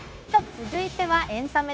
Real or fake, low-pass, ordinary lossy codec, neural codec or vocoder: fake; none; none; codec, 16 kHz, 0.9 kbps, LongCat-Audio-Codec